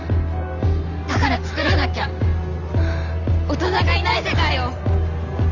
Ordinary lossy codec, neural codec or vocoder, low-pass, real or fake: none; none; 7.2 kHz; real